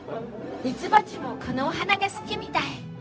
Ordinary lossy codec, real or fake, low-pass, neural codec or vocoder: none; fake; none; codec, 16 kHz, 0.4 kbps, LongCat-Audio-Codec